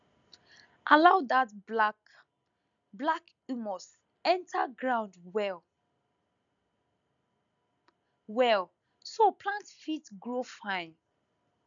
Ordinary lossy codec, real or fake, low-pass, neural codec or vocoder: none; real; 7.2 kHz; none